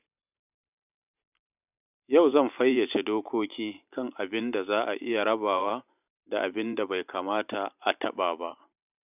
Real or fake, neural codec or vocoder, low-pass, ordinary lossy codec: fake; vocoder, 44.1 kHz, 128 mel bands every 512 samples, BigVGAN v2; 3.6 kHz; none